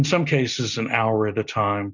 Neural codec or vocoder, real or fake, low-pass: none; real; 7.2 kHz